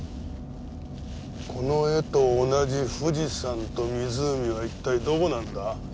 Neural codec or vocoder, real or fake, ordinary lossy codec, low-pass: none; real; none; none